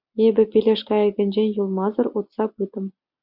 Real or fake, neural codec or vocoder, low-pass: real; none; 5.4 kHz